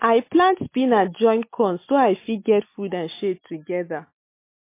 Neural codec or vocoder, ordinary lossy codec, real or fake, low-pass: none; MP3, 24 kbps; real; 3.6 kHz